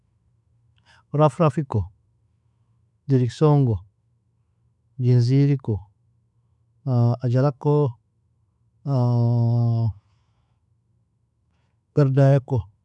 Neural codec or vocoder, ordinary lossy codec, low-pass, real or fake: autoencoder, 48 kHz, 128 numbers a frame, DAC-VAE, trained on Japanese speech; none; 10.8 kHz; fake